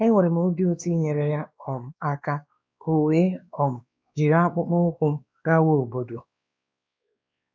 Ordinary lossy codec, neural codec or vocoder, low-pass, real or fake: none; codec, 16 kHz, 2 kbps, X-Codec, WavLM features, trained on Multilingual LibriSpeech; none; fake